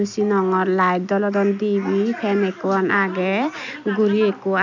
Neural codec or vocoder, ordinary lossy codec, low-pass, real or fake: none; none; 7.2 kHz; real